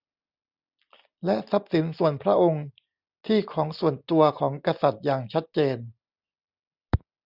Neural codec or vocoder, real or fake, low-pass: none; real; 5.4 kHz